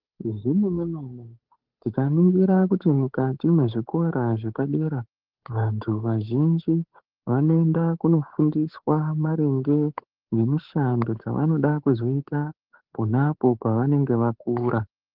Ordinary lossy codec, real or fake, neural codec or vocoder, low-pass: Opus, 16 kbps; fake; codec, 16 kHz, 8 kbps, FunCodec, trained on Chinese and English, 25 frames a second; 5.4 kHz